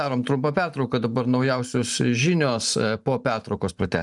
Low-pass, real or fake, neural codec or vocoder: 10.8 kHz; fake; vocoder, 44.1 kHz, 128 mel bands every 512 samples, BigVGAN v2